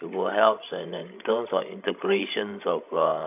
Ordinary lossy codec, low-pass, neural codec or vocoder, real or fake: AAC, 32 kbps; 3.6 kHz; codec, 16 kHz, 16 kbps, FreqCodec, larger model; fake